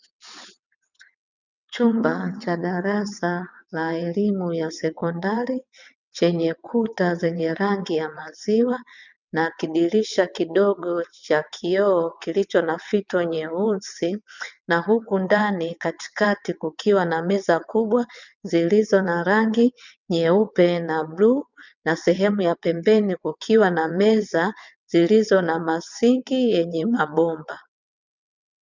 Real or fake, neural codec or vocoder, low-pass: fake; vocoder, 22.05 kHz, 80 mel bands, WaveNeXt; 7.2 kHz